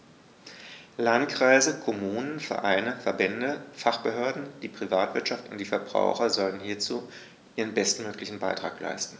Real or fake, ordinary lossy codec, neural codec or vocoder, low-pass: real; none; none; none